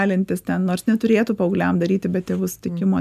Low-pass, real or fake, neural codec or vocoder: 14.4 kHz; real; none